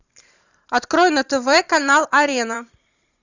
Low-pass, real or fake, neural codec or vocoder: 7.2 kHz; fake; vocoder, 44.1 kHz, 128 mel bands every 512 samples, BigVGAN v2